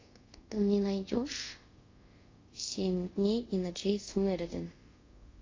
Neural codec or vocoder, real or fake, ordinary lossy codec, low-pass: codec, 24 kHz, 0.5 kbps, DualCodec; fake; AAC, 32 kbps; 7.2 kHz